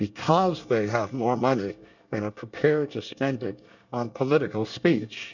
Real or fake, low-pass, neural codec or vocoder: fake; 7.2 kHz; codec, 24 kHz, 1 kbps, SNAC